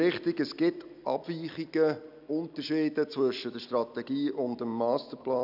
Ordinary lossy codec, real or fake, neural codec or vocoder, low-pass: none; real; none; 5.4 kHz